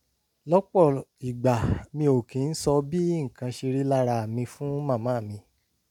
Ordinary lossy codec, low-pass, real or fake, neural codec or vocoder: none; 19.8 kHz; real; none